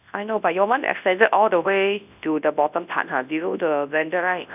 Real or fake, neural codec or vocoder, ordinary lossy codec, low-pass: fake; codec, 24 kHz, 0.9 kbps, WavTokenizer, large speech release; none; 3.6 kHz